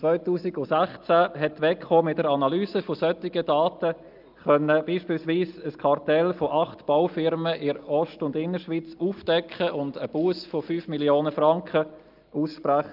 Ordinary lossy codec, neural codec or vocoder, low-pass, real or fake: Opus, 24 kbps; none; 5.4 kHz; real